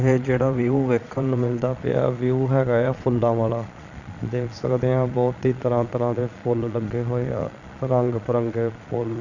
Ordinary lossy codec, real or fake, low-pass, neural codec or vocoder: none; fake; 7.2 kHz; vocoder, 22.05 kHz, 80 mel bands, Vocos